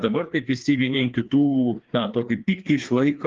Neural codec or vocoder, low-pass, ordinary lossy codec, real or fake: codec, 16 kHz, 2 kbps, FreqCodec, larger model; 7.2 kHz; Opus, 24 kbps; fake